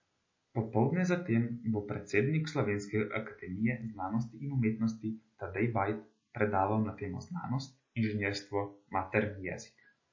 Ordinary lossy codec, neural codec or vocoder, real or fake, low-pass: MP3, 32 kbps; none; real; 7.2 kHz